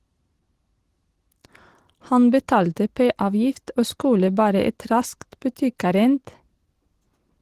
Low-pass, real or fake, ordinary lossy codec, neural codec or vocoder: 14.4 kHz; real; Opus, 16 kbps; none